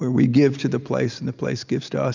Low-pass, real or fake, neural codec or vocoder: 7.2 kHz; real; none